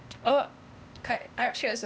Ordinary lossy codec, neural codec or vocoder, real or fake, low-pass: none; codec, 16 kHz, 0.8 kbps, ZipCodec; fake; none